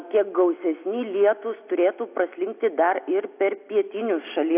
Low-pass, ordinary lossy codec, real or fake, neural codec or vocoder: 3.6 kHz; AAC, 32 kbps; real; none